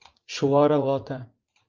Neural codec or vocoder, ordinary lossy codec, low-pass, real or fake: vocoder, 44.1 kHz, 80 mel bands, Vocos; Opus, 24 kbps; 7.2 kHz; fake